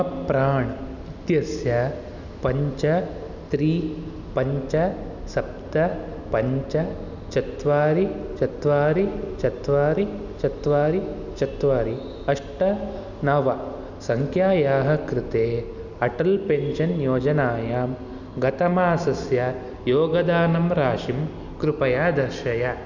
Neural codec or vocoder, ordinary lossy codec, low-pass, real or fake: none; none; 7.2 kHz; real